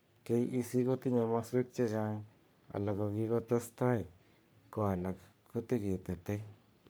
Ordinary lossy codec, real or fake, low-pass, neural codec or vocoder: none; fake; none; codec, 44.1 kHz, 3.4 kbps, Pupu-Codec